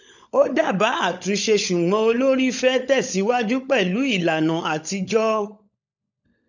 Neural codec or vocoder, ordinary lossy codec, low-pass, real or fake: codec, 16 kHz, 16 kbps, FunCodec, trained on LibriTTS, 50 frames a second; none; 7.2 kHz; fake